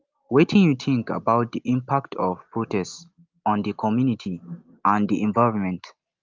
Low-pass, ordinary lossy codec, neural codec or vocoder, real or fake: 7.2 kHz; Opus, 24 kbps; none; real